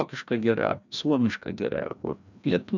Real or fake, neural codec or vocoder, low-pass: fake; codec, 16 kHz, 1 kbps, FreqCodec, larger model; 7.2 kHz